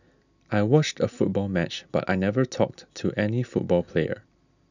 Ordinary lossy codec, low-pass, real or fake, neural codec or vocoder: none; 7.2 kHz; real; none